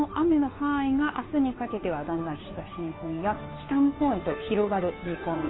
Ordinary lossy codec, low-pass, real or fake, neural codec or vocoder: AAC, 16 kbps; 7.2 kHz; fake; codec, 16 kHz, 2 kbps, FunCodec, trained on Chinese and English, 25 frames a second